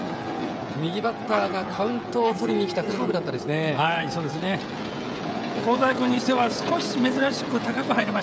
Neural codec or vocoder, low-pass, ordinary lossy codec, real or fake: codec, 16 kHz, 16 kbps, FreqCodec, smaller model; none; none; fake